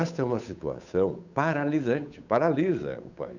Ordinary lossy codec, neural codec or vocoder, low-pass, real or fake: none; codec, 16 kHz, 8 kbps, FunCodec, trained on Chinese and English, 25 frames a second; 7.2 kHz; fake